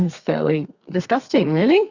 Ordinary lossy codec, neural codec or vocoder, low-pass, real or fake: Opus, 64 kbps; codec, 32 kHz, 1.9 kbps, SNAC; 7.2 kHz; fake